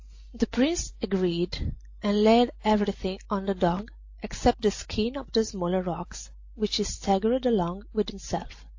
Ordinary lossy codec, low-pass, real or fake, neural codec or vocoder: AAC, 48 kbps; 7.2 kHz; real; none